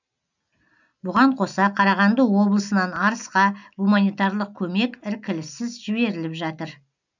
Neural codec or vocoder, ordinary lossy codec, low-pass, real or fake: none; none; 7.2 kHz; real